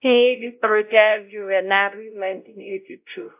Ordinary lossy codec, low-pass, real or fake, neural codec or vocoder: AAC, 32 kbps; 3.6 kHz; fake; codec, 16 kHz, 0.5 kbps, X-Codec, WavLM features, trained on Multilingual LibriSpeech